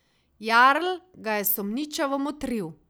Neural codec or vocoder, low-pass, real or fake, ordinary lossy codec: none; none; real; none